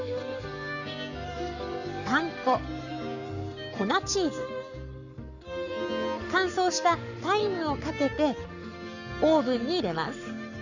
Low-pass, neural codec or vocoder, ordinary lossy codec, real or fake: 7.2 kHz; codec, 44.1 kHz, 7.8 kbps, Pupu-Codec; none; fake